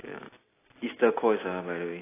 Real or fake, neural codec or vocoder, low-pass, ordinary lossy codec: real; none; 3.6 kHz; AAC, 16 kbps